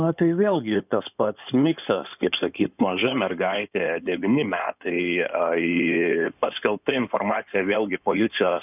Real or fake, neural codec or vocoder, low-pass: fake; codec, 16 kHz in and 24 kHz out, 2.2 kbps, FireRedTTS-2 codec; 3.6 kHz